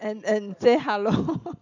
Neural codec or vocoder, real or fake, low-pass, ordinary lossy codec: none; real; 7.2 kHz; none